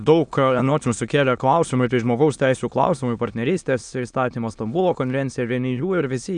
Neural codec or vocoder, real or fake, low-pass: autoencoder, 22.05 kHz, a latent of 192 numbers a frame, VITS, trained on many speakers; fake; 9.9 kHz